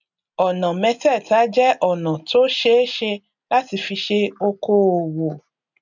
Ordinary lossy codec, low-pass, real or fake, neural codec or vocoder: none; 7.2 kHz; real; none